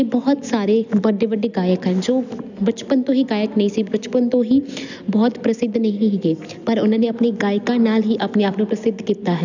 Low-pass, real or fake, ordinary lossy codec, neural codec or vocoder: 7.2 kHz; real; none; none